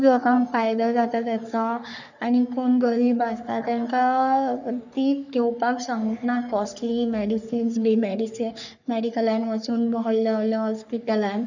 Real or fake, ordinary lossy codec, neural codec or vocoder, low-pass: fake; none; codec, 44.1 kHz, 3.4 kbps, Pupu-Codec; 7.2 kHz